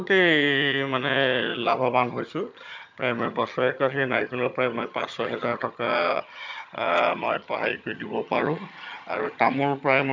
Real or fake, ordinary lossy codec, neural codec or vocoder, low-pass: fake; MP3, 64 kbps; vocoder, 22.05 kHz, 80 mel bands, HiFi-GAN; 7.2 kHz